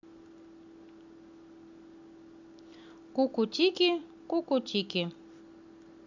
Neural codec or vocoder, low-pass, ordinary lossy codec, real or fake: none; 7.2 kHz; none; real